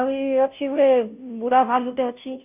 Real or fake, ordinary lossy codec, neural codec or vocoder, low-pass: fake; none; codec, 16 kHz, 0.5 kbps, FunCodec, trained on Chinese and English, 25 frames a second; 3.6 kHz